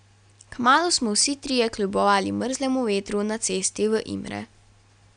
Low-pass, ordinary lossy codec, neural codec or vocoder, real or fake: 9.9 kHz; none; none; real